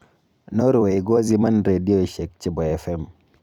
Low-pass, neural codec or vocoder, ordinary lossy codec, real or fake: 19.8 kHz; vocoder, 44.1 kHz, 128 mel bands every 256 samples, BigVGAN v2; none; fake